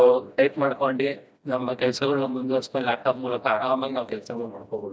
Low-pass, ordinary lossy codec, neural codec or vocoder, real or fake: none; none; codec, 16 kHz, 1 kbps, FreqCodec, smaller model; fake